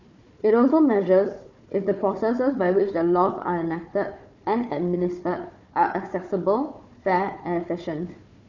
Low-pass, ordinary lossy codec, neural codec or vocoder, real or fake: 7.2 kHz; none; codec, 16 kHz, 4 kbps, FunCodec, trained on Chinese and English, 50 frames a second; fake